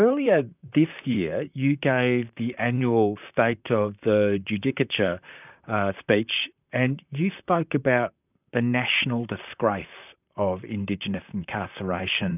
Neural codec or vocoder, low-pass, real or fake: vocoder, 44.1 kHz, 128 mel bands, Pupu-Vocoder; 3.6 kHz; fake